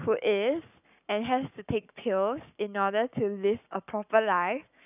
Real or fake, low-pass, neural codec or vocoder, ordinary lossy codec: fake; 3.6 kHz; codec, 44.1 kHz, 7.8 kbps, Pupu-Codec; none